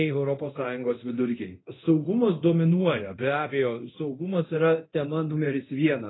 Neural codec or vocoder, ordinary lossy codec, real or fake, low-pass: codec, 24 kHz, 0.9 kbps, DualCodec; AAC, 16 kbps; fake; 7.2 kHz